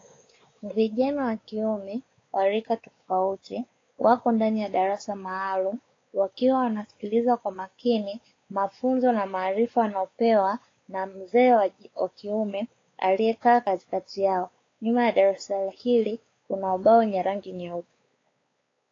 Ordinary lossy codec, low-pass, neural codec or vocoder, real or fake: AAC, 32 kbps; 7.2 kHz; codec, 16 kHz, 4 kbps, X-Codec, WavLM features, trained on Multilingual LibriSpeech; fake